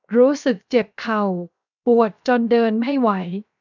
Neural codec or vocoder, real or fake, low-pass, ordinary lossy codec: codec, 16 kHz, 0.7 kbps, FocalCodec; fake; 7.2 kHz; none